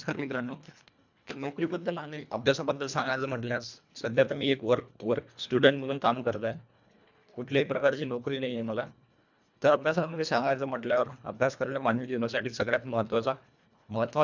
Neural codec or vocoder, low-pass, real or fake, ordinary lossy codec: codec, 24 kHz, 1.5 kbps, HILCodec; 7.2 kHz; fake; none